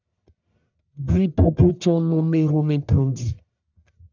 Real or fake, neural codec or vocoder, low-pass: fake; codec, 44.1 kHz, 1.7 kbps, Pupu-Codec; 7.2 kHz